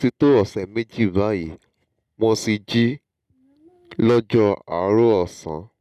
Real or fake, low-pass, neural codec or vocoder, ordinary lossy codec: real; 14.4 kHz; none; none